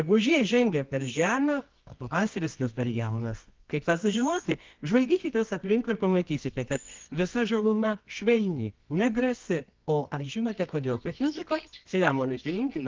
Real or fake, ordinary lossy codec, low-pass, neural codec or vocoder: fake; Opus, 32 kbps; 7.2 kHz; codec, 24 kHz, 0.9 kbps, WavTokenizer, medium music audio release